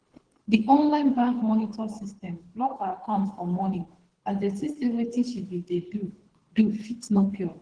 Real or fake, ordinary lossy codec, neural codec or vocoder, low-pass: fake; Opus, 16 kbps; codec, 24 kHz, 3 kbps, HILCodec; 9.9 kHz